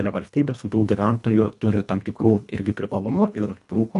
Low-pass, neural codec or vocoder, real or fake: 10.8 kHz; codec, 24 kHz, 1.5 kbps, HILCodec; fake